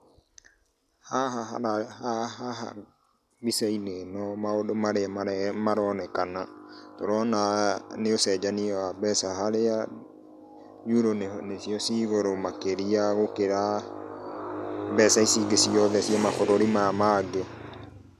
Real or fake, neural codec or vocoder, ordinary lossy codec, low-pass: real; none; none; 14.4 kHz